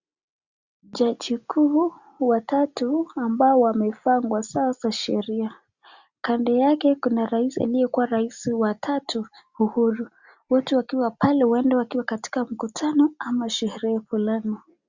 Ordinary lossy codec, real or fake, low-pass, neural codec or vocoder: Opus, 64 kbps; real; 7.2 kHz; none